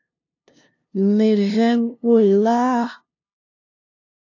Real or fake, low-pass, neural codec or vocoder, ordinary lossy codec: fake; 7.2 kHz; codec, 16 kHz, 0.5 kbps, FunCodec, trained on LibriTTS, 25 frames a second; AAC, 48 kbps